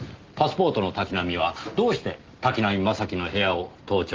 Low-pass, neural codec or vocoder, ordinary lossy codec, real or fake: 7.2 kHz; none; Opus, 16 kbps; real